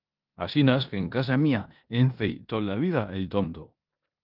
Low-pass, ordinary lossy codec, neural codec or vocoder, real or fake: 5.4 kHz; Opus, 24 kbps; codec, 16 kHz in and 24 kHz out, 0.9 kbps, LongCat-Audio-Codec, four codebook decoder; fake